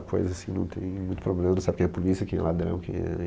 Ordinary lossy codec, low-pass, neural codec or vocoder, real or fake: none; none; none; real